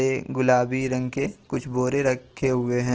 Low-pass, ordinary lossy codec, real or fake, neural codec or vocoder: 7.2 kHz; Opus, 16 kbps; real; none